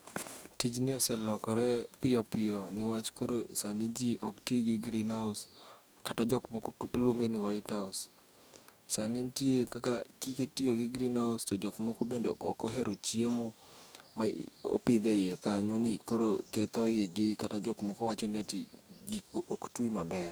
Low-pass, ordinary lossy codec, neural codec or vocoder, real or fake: none; none; codec, 44.1 kHz, 2.6 kbps, DAC; fake